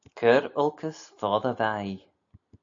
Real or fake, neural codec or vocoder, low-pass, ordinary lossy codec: real; none; 7.2 kHz; AAC, 64 kbps